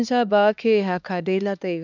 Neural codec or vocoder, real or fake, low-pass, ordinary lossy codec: codec, 16 kHz, 2 kbps, X-Codec, HuBERT features, trained on LibriSpeech; fake; 7.2 kHz; none